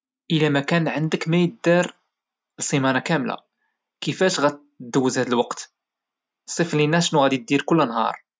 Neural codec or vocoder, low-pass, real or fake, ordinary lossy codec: none; none; real; none